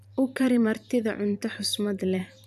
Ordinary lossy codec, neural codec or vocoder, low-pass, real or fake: none; vocoder, 44.1 kHz, 128 mel bands every 512 samples, BigVGAN v2; 14.4 kHz; fake